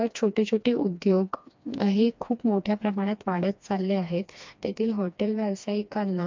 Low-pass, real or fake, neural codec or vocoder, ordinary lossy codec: 7.2 kHz; fake; codec, 16 kHz, 2 kbps, FreqCodec, smaller model; MP3, 64 kbps